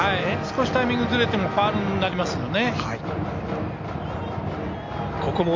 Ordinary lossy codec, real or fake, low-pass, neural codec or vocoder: MP3, 48 kbps; real; 7.2 kHz; none